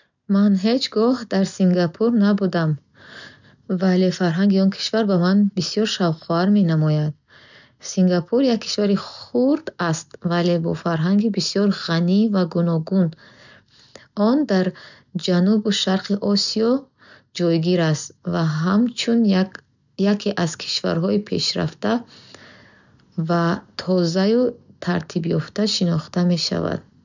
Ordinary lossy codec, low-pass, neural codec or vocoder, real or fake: none; 7.2 kHz; none; real